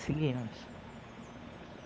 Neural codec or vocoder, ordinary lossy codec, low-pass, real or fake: codec, 16 kHz, 8 kbps, FunCodec, trained on Chinese and English, 25 frames a second; none; none; fake